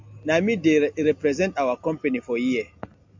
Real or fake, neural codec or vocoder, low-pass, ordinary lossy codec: real; none; 7.2 kHz; MP3, 64 kbps